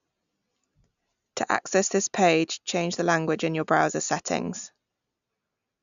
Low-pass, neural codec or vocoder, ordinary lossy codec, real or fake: 7.2 kHz; none; MP3, 96 kbps; real